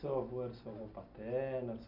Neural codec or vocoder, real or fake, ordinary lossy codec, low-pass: none; real; none; 5.4 kHz